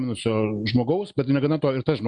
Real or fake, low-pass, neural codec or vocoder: real; 10.8 kHz; none